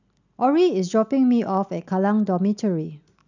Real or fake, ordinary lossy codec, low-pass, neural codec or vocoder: real; none; 7.2 kHz; none